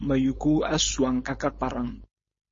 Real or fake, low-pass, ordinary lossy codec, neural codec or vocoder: fake; 7.2 kHz; MP3, 32 kbps; codec, 16 kHz, 4.8 kbps, FACodec